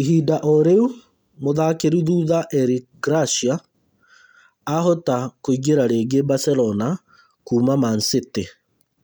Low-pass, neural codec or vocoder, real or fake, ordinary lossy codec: none; none; real; none